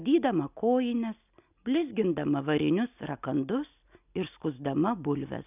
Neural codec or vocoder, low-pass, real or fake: none; 3.6 kHz; real